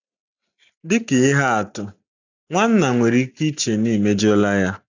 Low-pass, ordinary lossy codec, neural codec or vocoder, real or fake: 7.2 kHz; none; none; real